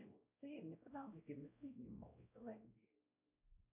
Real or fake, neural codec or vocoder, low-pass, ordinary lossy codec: fake; codec, 16 kHz, 0.5 kbps, X-Codec, WavLM features, trained on Multilingual LibriSpeech; 3.6 kHz; AAC, 32 kbps